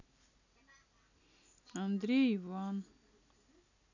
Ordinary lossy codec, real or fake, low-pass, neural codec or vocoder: none; real; 7.2 kHz; none